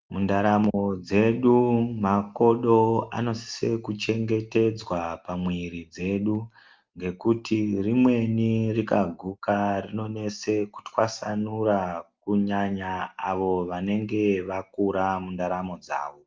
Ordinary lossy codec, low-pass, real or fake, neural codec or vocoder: Opus, 24 kbps; 7.2 kHz; real; none